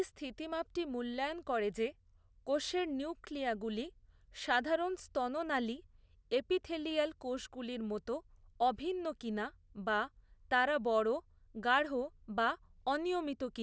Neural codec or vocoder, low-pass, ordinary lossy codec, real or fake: none; none; none; real